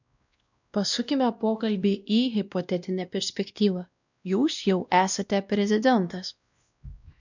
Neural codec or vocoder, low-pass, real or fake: codec, 16 kHz, 1 kbps, X-Codec, WavLM features, trained on Multilingual LibriSpeech; 7.2 kHz; fake